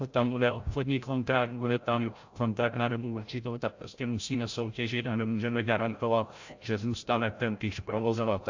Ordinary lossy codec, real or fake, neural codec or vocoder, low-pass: AAC, 48 kbps; fake; codec, 16 kHz, 0.5 kbps, FreqCodec, larger model; 7.2 kHz